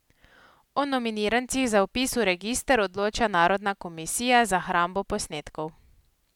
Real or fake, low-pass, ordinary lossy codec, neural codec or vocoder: real; 19.8 kHz; none; none